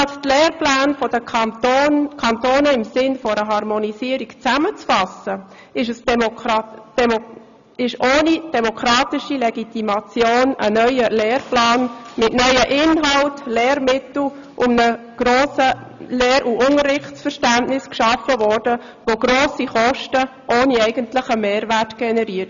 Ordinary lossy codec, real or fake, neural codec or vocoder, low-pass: none; real; none; 7.2 kHz